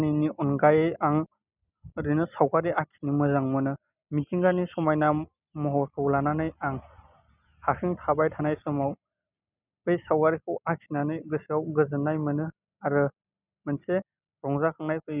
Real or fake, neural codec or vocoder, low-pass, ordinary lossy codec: real; none; 3.6 kHz; none